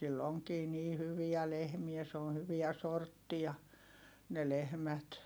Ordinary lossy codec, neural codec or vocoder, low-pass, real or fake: none; none; none; real